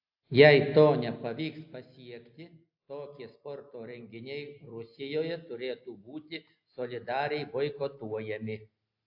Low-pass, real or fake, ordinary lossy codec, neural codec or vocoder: 5.4 kHz; real; AAC, 48 kbps; none